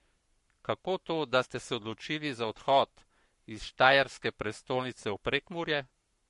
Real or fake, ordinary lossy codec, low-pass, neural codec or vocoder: fake; MP3, 48 kbps; 14.4 kHz; codec, 44.1 kHz, 7.8 kbps, Pupu-Codec